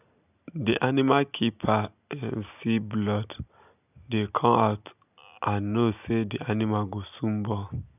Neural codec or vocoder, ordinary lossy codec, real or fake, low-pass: none; none; real; 3.6 kHz